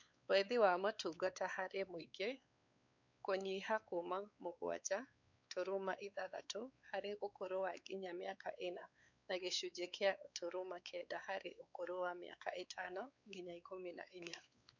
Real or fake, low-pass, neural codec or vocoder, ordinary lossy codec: fake; 7.2 kHz; codec, 16 kHz, 4 kbps, X-Codec, WavLM features, trained on Multilingual LibriSpeech; none